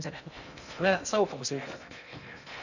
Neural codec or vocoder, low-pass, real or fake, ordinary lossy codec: codec, 16 kHz in and 24 kHz out, 0.6 kbps, FocalCodec, streaming, 2048 codes; 7.2 kHz; fake; none